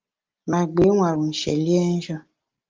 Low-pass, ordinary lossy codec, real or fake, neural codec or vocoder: 7.2 kHz; Opus, 24 kbps; real; none